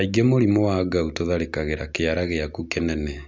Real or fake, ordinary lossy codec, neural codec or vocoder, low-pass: real; none; none; none